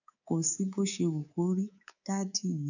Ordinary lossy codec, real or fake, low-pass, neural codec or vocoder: none; fake; 7.2 kHz; codec, 24 kHz, 3.1 kbps, DualCodec